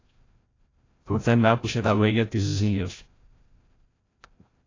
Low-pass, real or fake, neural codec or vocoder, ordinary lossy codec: 7.2 kHz; fake; codec, 16 kHz, 0.5 kbps, FreqCodec, larger model; AAC, 32 kbps